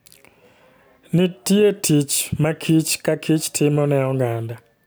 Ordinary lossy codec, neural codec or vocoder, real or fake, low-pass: none; none; real; none